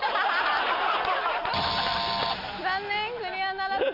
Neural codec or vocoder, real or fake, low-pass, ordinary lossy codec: none; real; 5.4 kHz; none